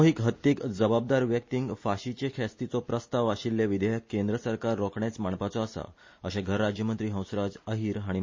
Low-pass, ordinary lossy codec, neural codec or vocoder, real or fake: 7.2 kHz; MP3, 32 kbps; none; real